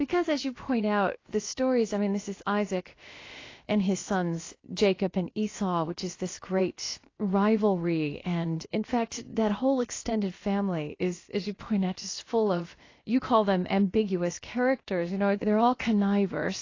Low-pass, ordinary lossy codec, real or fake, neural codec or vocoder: 7.2 kHz; AAC, 32 kbps; fake; codec, 16 kHz, about 1 kbps, DyCAST, with the encoder's durations